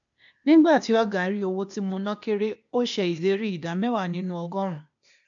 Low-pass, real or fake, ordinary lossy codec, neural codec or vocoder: 7.2 kHz; fake; MP3, 64 kbps; codec, 16 kHz, 0.8 kbps, ZipCodec